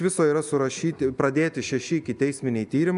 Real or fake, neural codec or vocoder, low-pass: real; none; 10.8 kHz